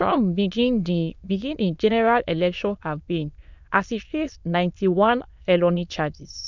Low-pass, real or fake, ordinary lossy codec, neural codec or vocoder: 7.2 kHz; fake; none; autoencoder, 22.05 kHz, a latent of 192 numbers a frame, VITS, trained on many speakers